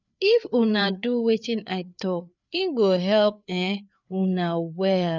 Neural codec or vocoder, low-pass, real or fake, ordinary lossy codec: codec, 16 kHz, 4 kbps, FreqCodec, larger model; 7.2 kHz; fake; none